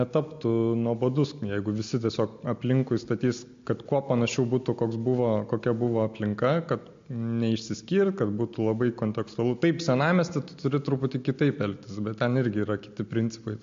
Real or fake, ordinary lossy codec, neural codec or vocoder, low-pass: real; MP3, 48 kbps; none; 7.2 kHz